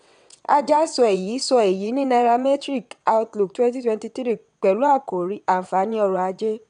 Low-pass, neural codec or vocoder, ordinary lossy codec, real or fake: 9.9 kHz; vocoder, 22.05 kHz, 80 mel bands, WaveNeXt; none; fake